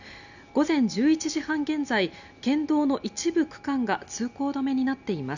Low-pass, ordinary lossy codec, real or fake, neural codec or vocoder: 7.2 kHz; none; real; none